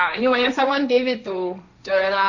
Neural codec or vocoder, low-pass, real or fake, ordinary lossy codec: codec, 16 kHz, 1.1 kbps, Voila-Tokenizer; 7.2 kHz; fake; none